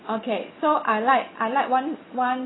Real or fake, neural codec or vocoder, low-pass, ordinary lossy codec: real; none; 7.2 kHz; AAC, 16 kbps